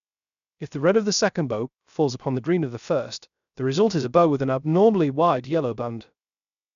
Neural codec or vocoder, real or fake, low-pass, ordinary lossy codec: codec, 16 kHz, 0.3 kbps, FocalCodec; fake; 7.2 kHz; none